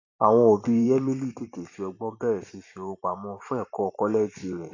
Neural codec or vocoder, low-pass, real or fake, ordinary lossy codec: vocoder, 44.1 kHz, 128 mel bands every 512 samples, BigVGAN v2; 7.2 kHz; fake; AAC, 48 kbps